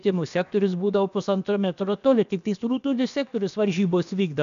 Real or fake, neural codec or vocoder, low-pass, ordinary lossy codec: fake; codec, 16 kHz, about 1 kbps, DyCAST, with the encoder's durations; 7.2 kHz; MP3, 96 kbps